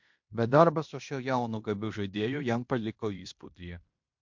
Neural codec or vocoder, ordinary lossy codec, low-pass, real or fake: codec, 16 kHz in and 24 kHz out, 0.9 kbps, LongCat-Audio-Codec, fine tuned four codebook decoder; MP3, 48 kbps; 7.2 kHz; fake